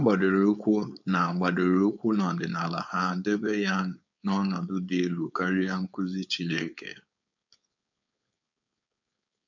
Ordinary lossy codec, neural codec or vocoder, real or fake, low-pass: none; codec, 16 kHz, 4.8 kbps, FACodec; fake; 7.2 kHz